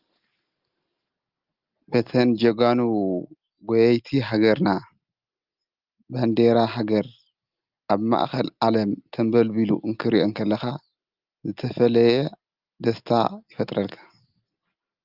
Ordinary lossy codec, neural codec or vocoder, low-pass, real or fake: Opus, 32 kbps; none; 5.4 kHz; real